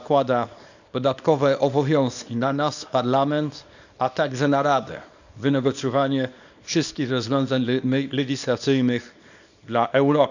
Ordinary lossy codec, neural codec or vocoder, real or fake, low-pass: none; codec, 24 kHz, 0.9 kbps, WavTokenizer, small release; fake; 7.2 kHz